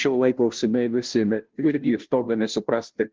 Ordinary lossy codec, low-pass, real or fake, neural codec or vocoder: Opus, 16 kbps; 7.2 kHz; fake; codec, 16 kHz, 0.5 kbps, FunCodec, trained on Chinese and English, 25 frames a second